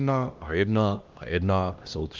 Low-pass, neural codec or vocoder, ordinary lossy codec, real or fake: 7.2 kHz; codec, 16 kHz, 1 kbps, X-Codec, HuBERT features, trained on LibriSpeech; Opus, 24 kbps; fake